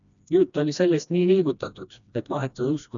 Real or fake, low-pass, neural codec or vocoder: fake; 7.2 kHz; codec, 16 kHz, 1 kbps, FreqCodec, smaller model